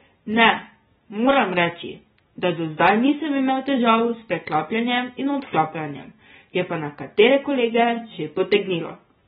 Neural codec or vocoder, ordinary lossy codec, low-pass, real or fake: none; AAC, 16 kbps; 10.8 kHz; real